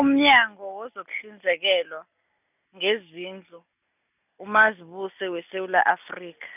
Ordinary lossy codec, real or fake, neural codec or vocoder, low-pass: none; real; none; 3.6 kHz